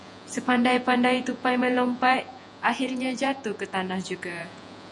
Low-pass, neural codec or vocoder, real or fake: 10.8 kHz; vocoder, 48 kHz, 128 mel bands, Vocos; fake